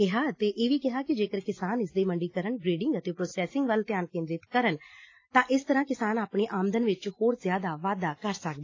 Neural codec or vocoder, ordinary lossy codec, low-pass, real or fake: none; AAC, 32 kbps; 7.2 kHz; real